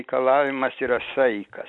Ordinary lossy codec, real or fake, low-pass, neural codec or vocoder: MP3, 64 kbps; real; 10.8 kHz; none